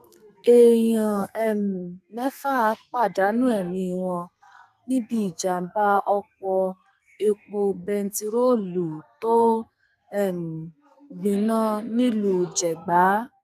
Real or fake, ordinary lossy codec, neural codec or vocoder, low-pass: fake; none; codec, 44.1 kHz, 2.6 kbps, SNAC; 14.4 kHz